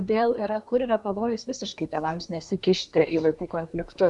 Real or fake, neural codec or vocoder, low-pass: fake; codec, 24 kHz, 1 kbps, SNAC; 10.8 kHz